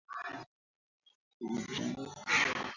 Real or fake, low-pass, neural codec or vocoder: real; 7.2 kHz; none